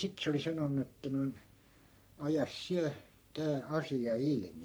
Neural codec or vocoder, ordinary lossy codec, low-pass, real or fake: codec, 44.1 kHz, 3.4 kbps, Pupu-Codec; none; none; fake